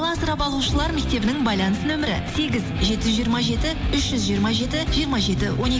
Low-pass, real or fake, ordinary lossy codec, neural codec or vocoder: none; real; none; none